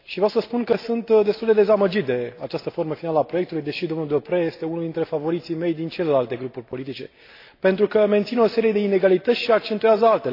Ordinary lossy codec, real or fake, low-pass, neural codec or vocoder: AAC, 32 kbps; real; 5.4 kHz; none